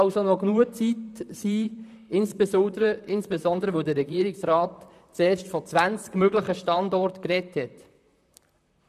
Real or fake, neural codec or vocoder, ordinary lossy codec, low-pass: fake; vocoder, 44.1 kHz, 128 mel bands, Pupu-Vocoder; none; 14.4 kHz